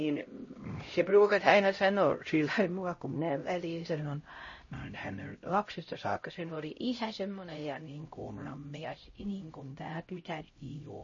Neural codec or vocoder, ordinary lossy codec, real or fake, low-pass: codec, 16 kHz, 0.5 kbps, X-Codec, HuBERT features, trained on LibriSpeech; MP3, 32 kbps; fake; 7.2 kHz